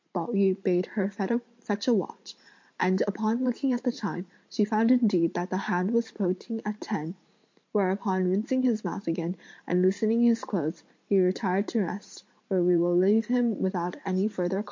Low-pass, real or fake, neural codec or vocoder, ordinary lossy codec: 7.2 kHz; fake; vocoder, 44.1 kHz, 128 mel bands every 512 samples, BigVGAN v2; MP3, 48 kbps